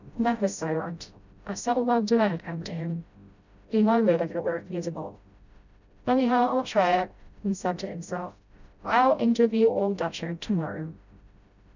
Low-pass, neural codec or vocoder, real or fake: 7.2 kHz; codec, 16 kHz, 0.5 kbps, FreqCodec, smaller model; fake